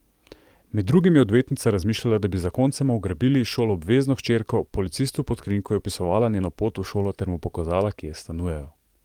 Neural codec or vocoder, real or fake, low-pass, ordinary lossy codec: codec, 44.1 kHz, 7.8 kbps, DAC; fake; 19.8 kHz; Opus, 32 kbps